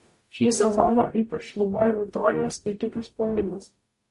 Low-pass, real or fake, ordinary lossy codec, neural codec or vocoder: 14.4 kHz; fake; MP3, 48 kbps; codec, 44.1 kHz, 0.9 kbps, DAC